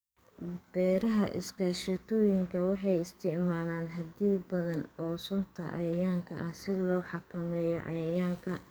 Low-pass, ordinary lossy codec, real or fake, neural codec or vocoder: none; none; fake; codec, 44.1 kHz, 2.6 kbps, SNAC